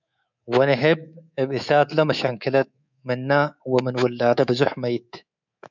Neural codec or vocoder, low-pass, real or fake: codec, 24 kHz, 3.1 kbps, DualCodec; 7.2 kHz; fake